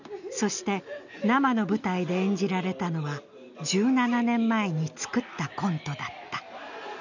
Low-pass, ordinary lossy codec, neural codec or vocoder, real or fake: 7.2 kHz; none; none; real